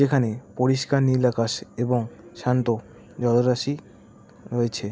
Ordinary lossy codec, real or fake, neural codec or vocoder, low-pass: none; real; none; none